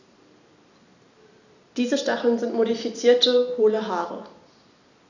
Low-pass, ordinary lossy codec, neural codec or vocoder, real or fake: 7.2 kHz; none; none; real